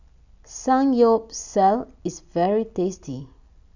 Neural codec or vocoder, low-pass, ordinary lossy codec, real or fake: none; 7.2 kHz; none; real